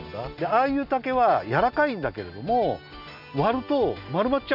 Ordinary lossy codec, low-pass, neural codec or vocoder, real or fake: none; 5.4 kHz; none; real